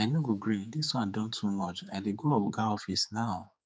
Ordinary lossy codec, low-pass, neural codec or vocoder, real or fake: none; none; codec, 16 kHz, 4 kbps, X-Codec, HuBERT features, trained on general audio; fake